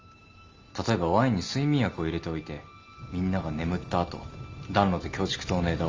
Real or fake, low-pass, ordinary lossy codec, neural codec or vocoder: real; 7.2 kHz; Opus, 32 kbps; none